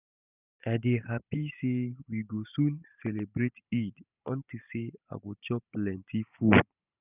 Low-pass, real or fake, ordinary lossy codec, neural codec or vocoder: 3.6 kHz; fake; none; vocoder, 44.1 kHz, 128 mel bands every 512 samples, BigVGAN v2